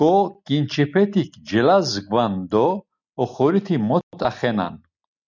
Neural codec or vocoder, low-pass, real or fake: none; 7.2 kHz; real